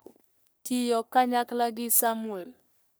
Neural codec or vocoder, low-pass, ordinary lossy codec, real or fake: codec, 44.1 kHz, 3.4 kbps, Pupu-Codec; none; none; fake